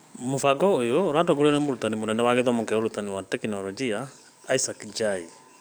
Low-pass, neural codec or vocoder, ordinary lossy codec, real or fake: none; codec, 44.1 kHz, 7.8 kbps, DAC; none; fake